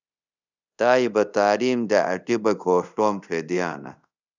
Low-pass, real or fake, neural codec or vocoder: 7.2 kHz; fake; codec, 24 kHz, 0.5 kbps, DualCodec